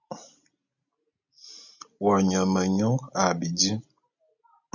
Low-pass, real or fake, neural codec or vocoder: 7.2 kHz; real; none